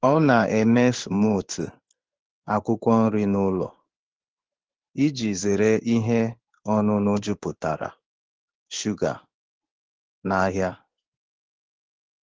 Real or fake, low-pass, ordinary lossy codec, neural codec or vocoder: fake; 7.2 kHz; Opus, 16 kbps; codec, 16 kHz in and 24 kHz out, 1 kbps, XY-Tokenizer